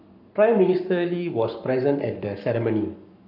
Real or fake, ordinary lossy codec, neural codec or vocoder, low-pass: fake; none; codec, 44.1 kHz, 7.8 kbps, Pupu-Codec; 5.4 kHz